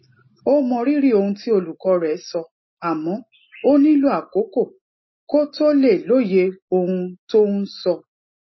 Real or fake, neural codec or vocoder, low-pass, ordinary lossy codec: real; none; 7.2 kHz; MP3, 24 kbps